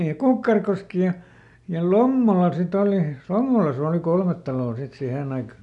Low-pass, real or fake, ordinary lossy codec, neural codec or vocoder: 10.8 kHz; real; none; none